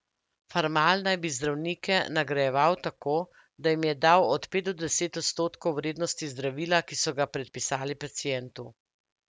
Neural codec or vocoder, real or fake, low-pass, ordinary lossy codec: none; real; none; none